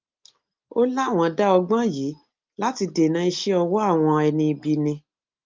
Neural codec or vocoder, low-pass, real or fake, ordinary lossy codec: none; 7.2 kHz; real; Opus, 24 kbps